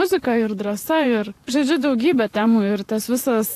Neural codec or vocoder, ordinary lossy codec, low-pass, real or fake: vocoder, 44.1 kHz, 128 mel bands, Pupu-Vocoder; AAC, 64 kbps; 14.4 kHz; fake